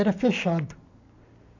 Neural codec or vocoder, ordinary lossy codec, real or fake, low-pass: none; AAC, 48 kbps; real; 7.2 kHz